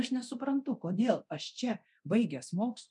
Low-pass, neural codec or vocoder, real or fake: 10.8 kHz; codec, 24 kHz, 0.9 kbps, DualCodec; fake